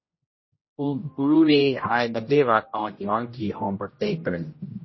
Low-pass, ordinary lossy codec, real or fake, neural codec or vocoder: 7.2 kHz; MP3, 24 kbps; fake; codec, 16 kHz, 0.5 kbps, X-Codec, HuBERT features, trained on general audio